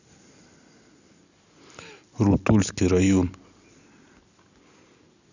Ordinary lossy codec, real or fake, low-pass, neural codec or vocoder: none; real; 7.2 kHz; none